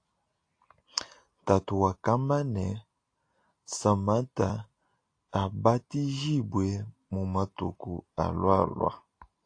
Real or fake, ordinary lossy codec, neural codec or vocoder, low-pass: real; AAC, 48 kbps; none; 9.9 kHz